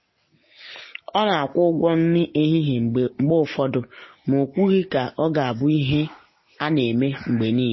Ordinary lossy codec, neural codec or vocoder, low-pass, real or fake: MP3, 24 kbps; none; 7.2 kHz; real